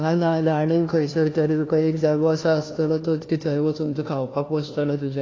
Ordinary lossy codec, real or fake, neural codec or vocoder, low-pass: AAC, 32 kbps; fake; codec, 16 kHz, 1 kbps, FunCodec, trained on LibriTTS, 50 frames a second; 7.2 kHz